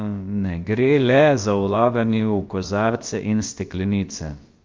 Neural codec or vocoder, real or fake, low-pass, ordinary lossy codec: codec, 16 kHz, about 1 kbps, DyCAST, with the encoder's durations; fake; 7.2 kHz; Opus, 32 kbps